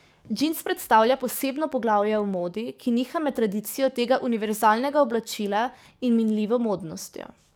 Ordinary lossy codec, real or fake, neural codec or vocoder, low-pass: none; fake; codec, 44.1 kHz, 7.8 kbps, DAC; none